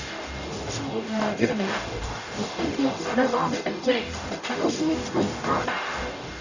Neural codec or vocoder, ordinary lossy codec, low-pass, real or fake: codec, 44.1 kHz, 0.9 kbps, DAC; none; 7.2 kHz; fake